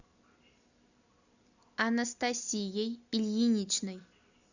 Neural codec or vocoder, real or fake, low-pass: none; real; 7.2 kHz